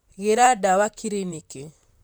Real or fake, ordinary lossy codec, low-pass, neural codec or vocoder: fake; none; none; vocoder, 44.1 kHz, 128 mel bands, Pupu-Vocoder